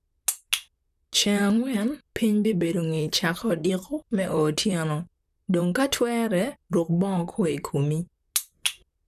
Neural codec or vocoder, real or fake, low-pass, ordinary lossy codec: vocoder, 44.1 kHz, 128 mel bands, Pupu-Vocoder; fake; 14.4 kHz; none